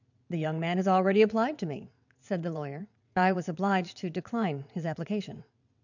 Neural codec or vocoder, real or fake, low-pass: vocoder, 22.05 kHz, 80 mel bands, WaveNeXt; fake; 7.2 kHz